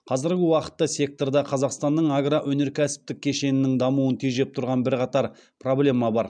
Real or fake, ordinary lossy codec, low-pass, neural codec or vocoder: real; MP3, 96 kbps; 9.9 kHz; none